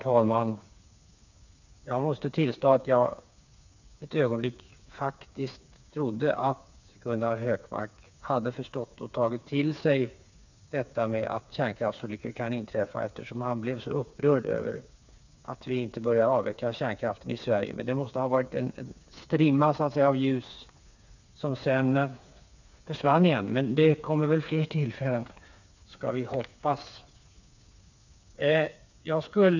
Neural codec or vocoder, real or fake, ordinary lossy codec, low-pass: codec, 16 kHz, 4 kbps, FreqCodec, smaller model; fake; none; 7.2 kHz